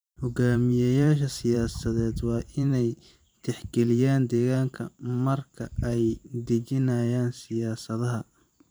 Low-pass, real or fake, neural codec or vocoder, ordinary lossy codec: none; fake; vocoder, 44.1 kHz, 128 mel bands every 512 samples, BigVGAN v2; none